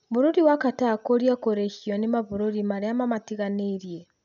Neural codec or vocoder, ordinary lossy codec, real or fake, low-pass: none; none; real; 7.2 kHz